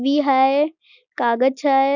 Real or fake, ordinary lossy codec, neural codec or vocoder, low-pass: real; none; none; 7.2 kHz